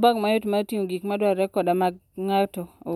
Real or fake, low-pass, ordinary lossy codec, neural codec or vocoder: real; 19.8 kHz; none; none